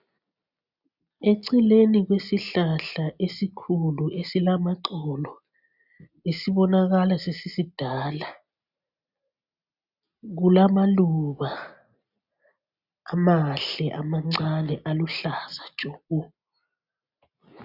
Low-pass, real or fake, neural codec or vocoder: 5.4 kHz; real; none